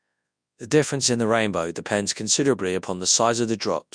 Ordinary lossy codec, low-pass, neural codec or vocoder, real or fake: none; 9.9 kHz; codec, 24 kHz, 0.9 kbps, WavTokenizer, large speech release; fake